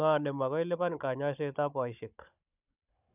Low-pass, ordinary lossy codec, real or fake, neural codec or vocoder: 3.6 kHz; none; fake; codec, 16 kHz, 16 kbps, FunCodec, trained on Chinese and English, 50 frames a second